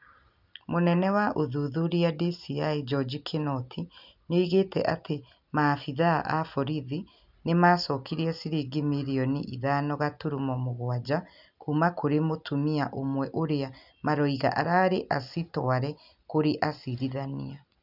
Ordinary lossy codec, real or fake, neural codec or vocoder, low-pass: none; real; none; 5.4 kHz